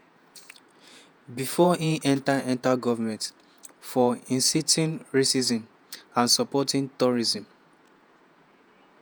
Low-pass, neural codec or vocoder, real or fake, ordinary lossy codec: none; vocoder, 48 kHz, 128 mel bands, Vocos; fake; none